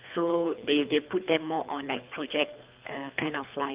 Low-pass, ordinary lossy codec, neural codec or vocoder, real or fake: 3.6 kHz; Opus, 24 kbps; codec, 24 kHz, 3 kbps, HILCodec; fake